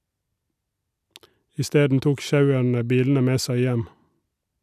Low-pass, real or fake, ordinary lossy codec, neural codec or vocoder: 14.4 kHz; real; none; none